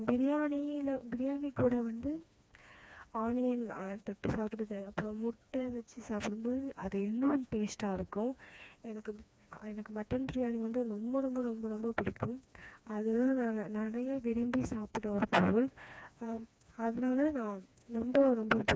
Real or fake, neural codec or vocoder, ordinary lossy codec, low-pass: fake; codec, 16 kHz, 2 kbps, FreqCodec, smaller model; none; none